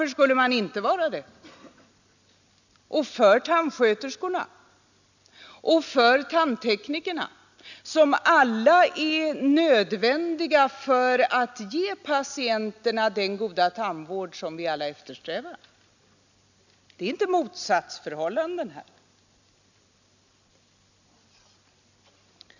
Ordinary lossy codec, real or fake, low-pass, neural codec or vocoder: none; real; 7.2 kHz; none